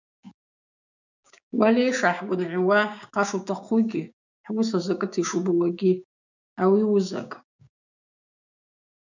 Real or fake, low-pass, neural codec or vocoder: fake; 7.2 kHz; codec, 16 kHz, 6 kbps, DAC